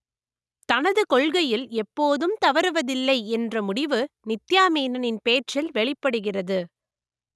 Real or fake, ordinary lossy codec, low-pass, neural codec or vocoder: real; none; none; none